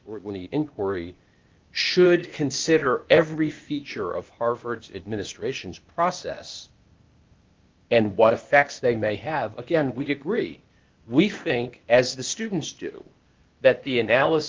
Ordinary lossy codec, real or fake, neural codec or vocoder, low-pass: Opus, 32 kbps; fake; codec, 16 kHz, 0.8 kbps, ZipCodec; 7.2 kHz